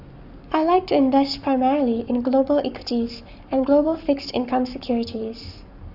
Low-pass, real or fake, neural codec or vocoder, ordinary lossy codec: 5.4 kHz; fake; codec, 44.1 kHz, 7.8 kbps, Pupu-Codec; none